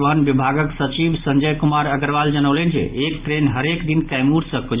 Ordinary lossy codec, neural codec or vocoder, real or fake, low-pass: Opus, 32 kbps; none; real; 3.6 kHz